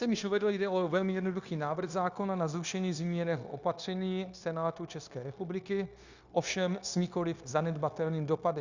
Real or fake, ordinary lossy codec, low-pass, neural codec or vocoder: fake; Opus, 64 kbps; 7.2 kHz; codec, 16 kHz, 0.9 kbps, LongCat-Audio-Codec